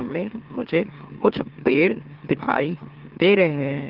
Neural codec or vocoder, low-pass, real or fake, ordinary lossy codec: autoencoder, 44.1 kHz, a latent of 192 numbers a frame, MeloTTS; 5.4 kHz; fake; Opus, 16 kbps